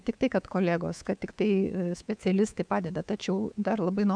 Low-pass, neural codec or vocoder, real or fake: 9.9 kHz; codec, 24 kHz, 3.1 kbps, DualCodec; fake